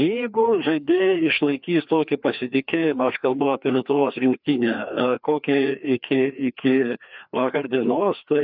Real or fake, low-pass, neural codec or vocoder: fake; 5.4 kHz; codec, 16 kHz, 2 kbps, FreqCodec, larger model